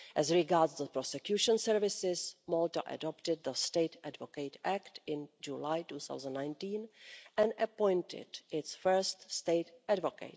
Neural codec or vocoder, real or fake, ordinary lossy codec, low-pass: none; real; none; none